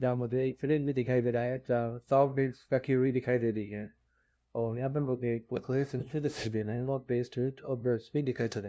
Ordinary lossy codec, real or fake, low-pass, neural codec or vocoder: none; fake; none; codec, 16 kHz, 0.5 kbps, FunCodec, trained on LibriTTS, 25 frames a second